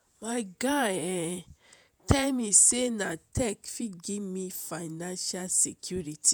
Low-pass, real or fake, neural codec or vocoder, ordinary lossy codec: none; fake; vocoder, 48 kHz, 128 mel bands, Vocos; none